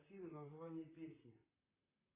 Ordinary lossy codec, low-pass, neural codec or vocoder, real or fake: AAC, 24 kbps; 3.6 kHz; vocoder, 44.1 kHz, 128 mel bands, Pupu-Vocoder; fake